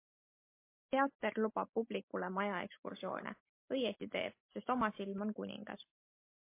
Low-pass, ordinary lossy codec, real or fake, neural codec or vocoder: 3.6 kHz; MP3, 24 kbps; real; none